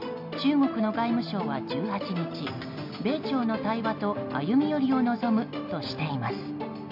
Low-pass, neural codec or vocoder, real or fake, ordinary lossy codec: 5.4 kHz; none; real; MP3, 48 kbps